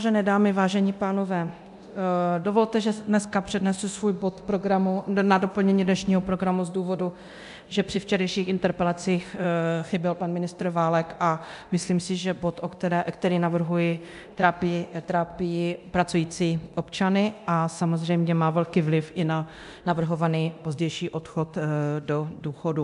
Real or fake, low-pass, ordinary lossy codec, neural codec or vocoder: fake; 10.8 kHz; MP3, 96 kbps; codec, 24 kHz, 0.9 kbps, DualCodec